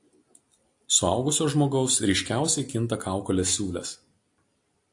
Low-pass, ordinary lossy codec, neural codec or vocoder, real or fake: 10.8 kHz; AAC, 64 kbps; none; real